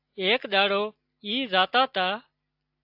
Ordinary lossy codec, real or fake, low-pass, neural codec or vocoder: AAC, 48 kbps; real; 5.4 kHz; none